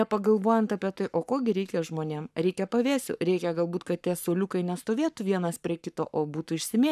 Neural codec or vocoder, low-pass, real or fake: codec, 44.1 kHz, 7.8 kbps, Pupu-Codec; 14.4 kHz; fake